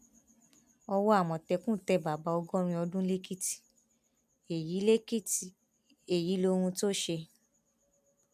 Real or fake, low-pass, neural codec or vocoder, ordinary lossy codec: real; 14.4 kHz; none; none